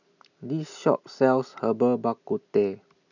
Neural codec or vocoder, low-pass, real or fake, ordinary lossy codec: none; 7.2 kHz; real; none